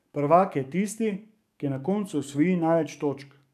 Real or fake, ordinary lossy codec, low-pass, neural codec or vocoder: fake; none; 14.4 kHz; codec, 44.1 kHz, 7.8 kbps, DAC